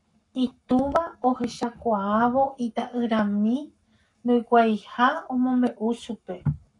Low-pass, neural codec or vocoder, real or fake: 10.8 kHz; codec, 44.1 kHz, 7.8 kbps, Pupu-Codec; fake